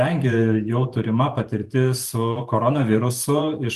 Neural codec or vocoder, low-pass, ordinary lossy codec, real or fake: vocoder, 44.1 kHz, 128 mel bands every 512 samples, BigVGAN v2; 14.4 kHz; Opus, 24 kbps; fake